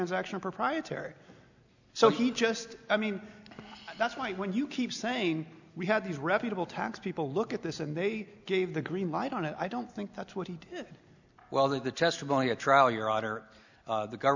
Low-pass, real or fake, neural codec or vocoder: 7.2 kHz; real; none